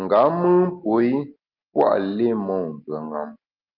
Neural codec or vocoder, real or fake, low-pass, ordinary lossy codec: none; real; 5.4 kHz; Opus, 32 kbps